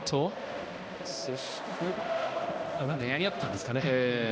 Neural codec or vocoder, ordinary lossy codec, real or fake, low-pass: codec, 16 kHz, 1 kbps, X-Codec, HuBERT features, trained on balanced general audio; none; fake; none